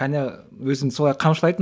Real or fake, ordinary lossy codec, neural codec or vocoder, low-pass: real; none; none; none